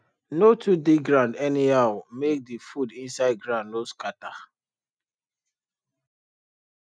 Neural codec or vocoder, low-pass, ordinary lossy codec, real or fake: none; 9.9 kHz; none; real